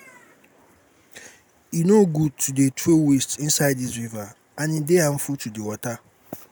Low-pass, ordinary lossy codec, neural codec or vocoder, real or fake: none; none; none; real